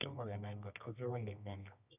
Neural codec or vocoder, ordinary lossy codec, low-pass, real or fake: codec, 24 kHz, 0.9 kbps, WavTokenizer, medium music audio release; none; 3.6 kHz; fake